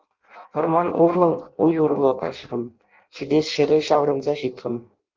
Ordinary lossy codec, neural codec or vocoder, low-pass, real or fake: Opus, 32 kbps; codec, 16 kHz in and 24 kHz out, 0.6 kbps, FireRedTTS-2 codec; 7.2 kHz; fake